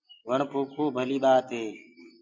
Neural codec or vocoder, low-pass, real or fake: none; 7.2 kHz; real